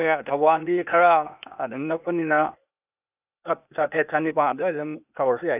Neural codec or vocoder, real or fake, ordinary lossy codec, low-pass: codec, 16 kHz, 0.8 kbps, ZipCodec; fake; none; 3.6 kHz